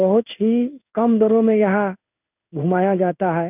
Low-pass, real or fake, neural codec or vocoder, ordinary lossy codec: 3.6 kHz; fake; codec, 16 kHz in and 24 kHz out, 1 kbps, XY-Tokenizer; none